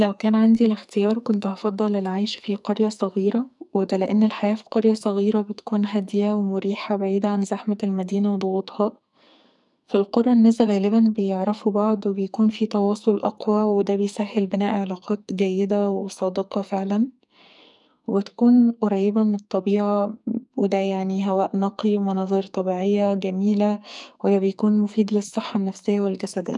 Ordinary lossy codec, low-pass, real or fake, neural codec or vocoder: none; 10.8 kHz; fake; codec, 44.1 kHz, 2.6 kbps, SNAC